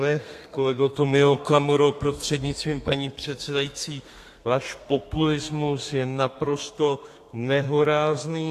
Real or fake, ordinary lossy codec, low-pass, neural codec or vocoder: fake; AAC, 64 kbps; 14.4 kHz; codec, 32 kHz, 1.9 kbps, SNAC